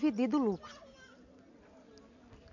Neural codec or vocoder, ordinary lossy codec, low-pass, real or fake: none; none; 7.2 kHz; real